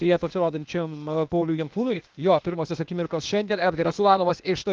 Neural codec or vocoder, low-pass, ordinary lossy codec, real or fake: codec, 16 kHz, 0.8 kbps, ZipCodec; 7.2 kHz; Opus, 32 kbps; fake